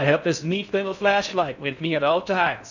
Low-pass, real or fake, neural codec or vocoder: 7.2 kHz; fake; codec, 16 kHz in and 24 kHz out, 0.6 kbps, FocalCodec, streaming, 4096 codes